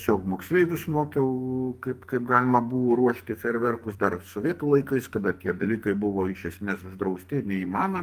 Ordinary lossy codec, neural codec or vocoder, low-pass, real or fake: Opus, 32 kbps; codec, 44.1 kHz, 2.6 kbps, SNAC; 14.4 kHz; fake